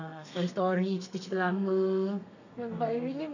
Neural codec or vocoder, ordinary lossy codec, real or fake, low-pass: codec, 32 kHz, 1.9 kbps, SNAC; none; fake; 7.2 kHz